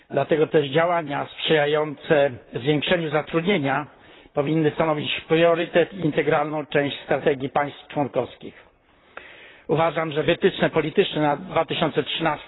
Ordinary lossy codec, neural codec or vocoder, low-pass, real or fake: AAC, 16 kbps; vocoder, 44.1 kHz, 128 mel bands, Pupu-Vocoder; 7.2 kHz; fake